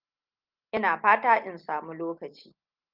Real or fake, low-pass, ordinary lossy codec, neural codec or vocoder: real; 5.4 kHz; Opus, 32 kbps; none